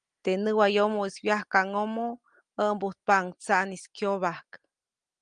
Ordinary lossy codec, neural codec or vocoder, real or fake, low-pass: Opus, 32 kbps; none; real; 9.9 kHz